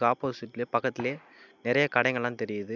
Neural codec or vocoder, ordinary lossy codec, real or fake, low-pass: none; none; real; 7.2 kHz